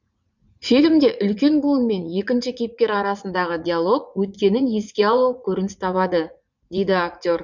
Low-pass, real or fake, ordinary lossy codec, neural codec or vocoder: 7.2 kHz; fake; none; vocoder, 44.1 kHz, 80 mel bands, Vocos